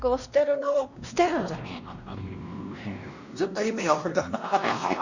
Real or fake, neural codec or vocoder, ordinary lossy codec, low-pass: fake; codec, 16 kHz, 1 kbps, X-Codec, WavLM features, trained on Multilingual LibriSpeech; none; 7.2 kHz